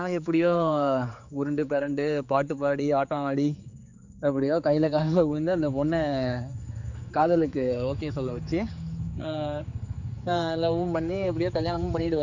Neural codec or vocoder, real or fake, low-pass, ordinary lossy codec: codec, 16 kHz, 4 kbps, X-Codec, HuBERT features, trained on general audio; fake; 7.2 kHz; none